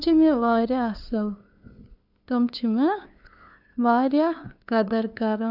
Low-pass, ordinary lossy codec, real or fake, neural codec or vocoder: 5.4 kHz; none; fake; codec, 16 kHz, 2 kbps, FunCodec, trained on LibriTTS, 25 frames a second